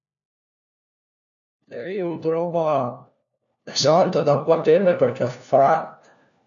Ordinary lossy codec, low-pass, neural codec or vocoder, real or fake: none; 7.2 kHz; codec, 16 kHz, 1 kbps, FunCodec, trained on LibriTTS, 50 frames a second; fake